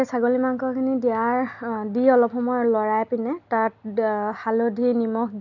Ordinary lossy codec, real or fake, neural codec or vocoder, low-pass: none; real; none; 7.2 kHz